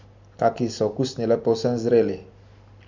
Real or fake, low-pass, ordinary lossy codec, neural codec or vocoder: real; 7.2 kHz; MP3, 64 kbps; none